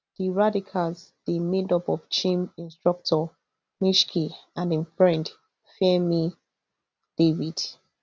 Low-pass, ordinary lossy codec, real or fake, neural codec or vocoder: none; none; real; none